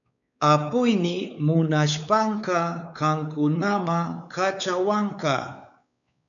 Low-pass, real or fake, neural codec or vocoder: 7.2 kHz; fake; codec, 16 kHz, 4 kbps, X-Codec, WavLM features, trained on Multilingual LibriSpeech